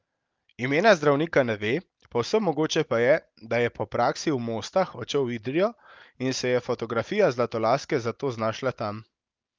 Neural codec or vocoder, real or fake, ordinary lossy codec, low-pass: none; real; Opus, 24 kbps; 7.2 kHz